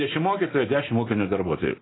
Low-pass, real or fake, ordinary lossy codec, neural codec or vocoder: 7.2 kHz; real; AAC, 16 kbps; none